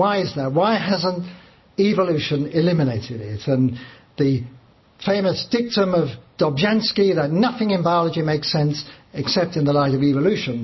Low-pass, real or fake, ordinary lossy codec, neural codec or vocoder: 7.2 kHz; real; MP3, 24 kbps; none